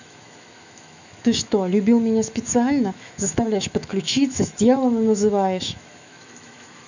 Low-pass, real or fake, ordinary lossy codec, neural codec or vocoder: 7.2 kHz; fake; none; autoencoder, 48 kHz, 128 numbers a frame, DAC-VAE, trained on Japanese speech